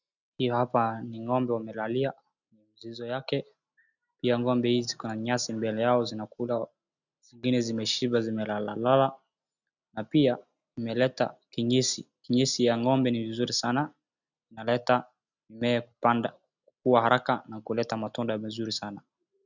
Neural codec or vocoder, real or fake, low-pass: none; real; 7.2 kHz